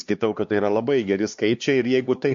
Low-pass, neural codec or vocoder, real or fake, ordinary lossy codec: 7.2 kHz; codec, 16 kHz, 2 kbps, X-Codec, HuBERT features, trained on LibriSpeech; fake; MP3, 48 kbps